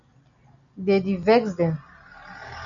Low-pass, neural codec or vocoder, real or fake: 7.2 kHz; none; real